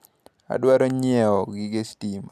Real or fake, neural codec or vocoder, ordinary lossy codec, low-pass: real; none; none; 14.4 kHz